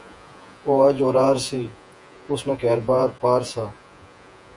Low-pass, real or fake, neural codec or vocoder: 10.8 kHz; fake; vocoder, 48 kHz, 128 mel bands, Vocos